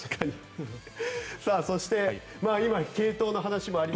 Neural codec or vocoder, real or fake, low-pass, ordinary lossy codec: none; real; none; none